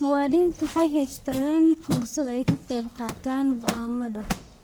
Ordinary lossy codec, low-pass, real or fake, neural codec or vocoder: none; none; fake; codec, 44.1 kHz, 1.7 kbps, Pupu-Codec